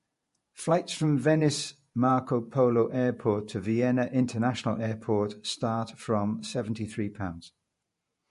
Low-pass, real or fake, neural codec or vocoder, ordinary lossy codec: 14.4 kHz; fake; vocoder, 48 kHz, 128 mel bands, Vocos; MP3, 48 kbps